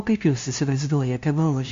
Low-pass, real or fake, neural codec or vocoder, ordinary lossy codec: 7.2 kHz; fake; codec, 16 kHz, 0.5 kbps, FunCodec, trained on LibriTTS, 25 frames a second; MP3, 48 kbps